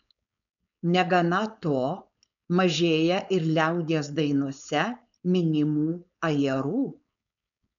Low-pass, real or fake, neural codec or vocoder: 7.2 kHz; fake; codec, 16 kHz, 4.8 kbps, FACodec